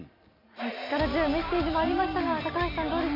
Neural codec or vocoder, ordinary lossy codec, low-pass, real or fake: none; none; 5.4 kHz; real